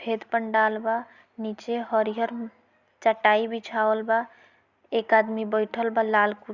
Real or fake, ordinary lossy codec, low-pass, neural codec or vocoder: real; Opus, 64 kbps; 7.2 kHz; none